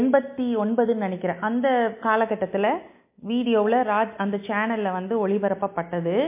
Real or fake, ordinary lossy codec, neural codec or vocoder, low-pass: real; MP3, 24 kbps; none; 3.6 kHz